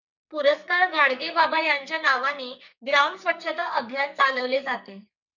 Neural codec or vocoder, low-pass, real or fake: codec, 44.1 kHz, 2.6 kbps, SNAC; 7.2 kHz; fake